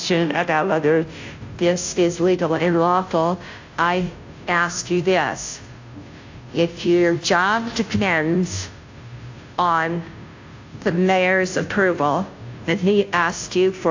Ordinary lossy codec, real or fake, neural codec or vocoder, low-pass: AAC, 48 kbps; fake; codec, 16 kHz, 0.5 kbps, FunCodec, trained on Chinese and English, 25 frames a second; 7.2 kHz